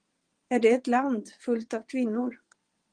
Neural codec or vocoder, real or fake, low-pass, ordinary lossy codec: vocoder, 22.05 kHz, 80 mel bands, WaveNeXt; fake; 9.9 kHz; Opus, 24 kbps